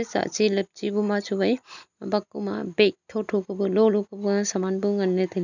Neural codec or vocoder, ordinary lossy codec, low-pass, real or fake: none; none; 7.2 kHz; real